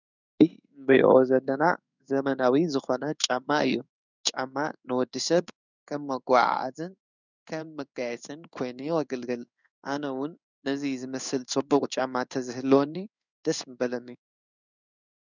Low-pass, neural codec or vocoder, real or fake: 7.2 kHz; codec, 16 kHz in and 24 kHz out, 1 kbps, XY-Tokenizer; fake